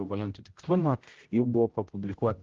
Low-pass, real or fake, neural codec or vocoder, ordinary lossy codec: 7.2 kHz; fake; codec, 16 kHz, 0.5 kbps, X-Codec, HuBERT features, trained on general audio; Opus, 16 kbps